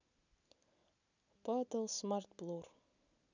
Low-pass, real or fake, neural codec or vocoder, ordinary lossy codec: 7.2 kHz; real; none; none